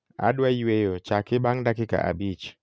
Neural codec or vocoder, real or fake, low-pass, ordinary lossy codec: none; real; none; none